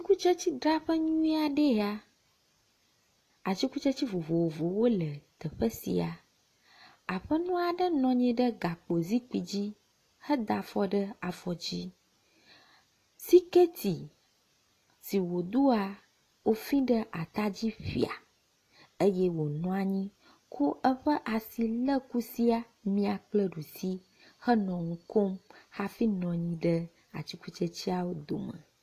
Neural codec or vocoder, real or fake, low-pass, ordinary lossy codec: none; real; 14.4 kHz; AAC, 48 kbps